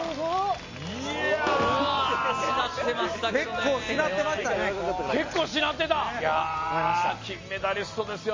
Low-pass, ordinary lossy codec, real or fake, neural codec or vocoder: 7.2 kHz; MP3, 32 kbps; real; none